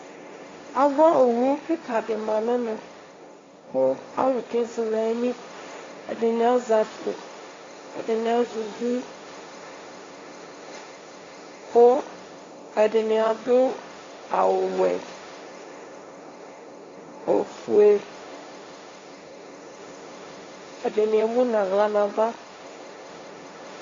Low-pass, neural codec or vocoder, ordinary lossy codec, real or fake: 7.2 kHz; codec, 16 kHz, 1.1 kbps, Voila-Tokenizer; AAC, 32 kbps; fake